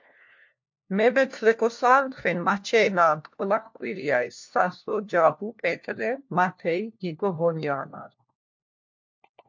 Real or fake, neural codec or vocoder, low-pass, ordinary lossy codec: fake; codec, 16 kHz, 1 kbps, FunCodec, trained on LibriTTS, 50 frames a second; 7.2 kHz; MP3, 48 kbps